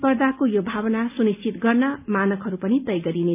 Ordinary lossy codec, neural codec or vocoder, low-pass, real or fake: none; none; 3.6 kHz; real